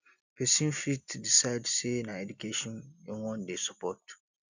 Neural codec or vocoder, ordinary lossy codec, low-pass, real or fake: none; none; 7.2 kHz; real